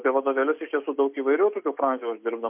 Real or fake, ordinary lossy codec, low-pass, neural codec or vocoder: real; MP3, 32 kbps; 3.6 kHz; none